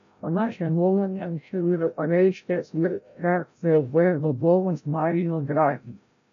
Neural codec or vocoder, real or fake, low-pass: codec, 16 kHz, 0.5 kbps, FreqCodec, larger model; fake; 7.2 kHz